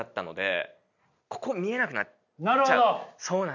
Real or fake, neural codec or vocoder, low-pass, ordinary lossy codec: real; none; 7.2 kHz; none